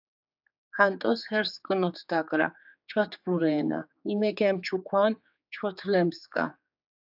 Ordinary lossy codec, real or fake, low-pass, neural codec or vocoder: AAC, 48 kbps; fake; 5.4 kHz; codec, 16 kHz, 4 kbps, X-Codec, HuBERT features, trained on general audio